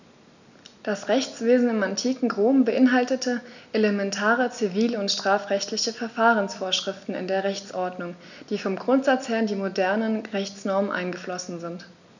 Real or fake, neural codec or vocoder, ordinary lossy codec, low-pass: real; none; none; 7.2 kHz